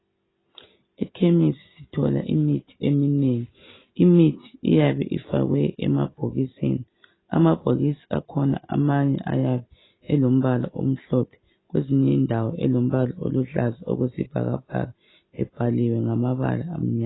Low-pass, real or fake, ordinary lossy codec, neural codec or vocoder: 7.2 kHz; real; AAC, 16 kbps; none